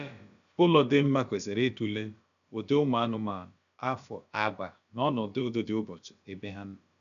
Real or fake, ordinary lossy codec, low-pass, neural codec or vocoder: fake; MP3, 96 kbps; 7.2 kHz; codec, 16 kHz, about 1 kbps, DyCAST, with the encoder's durations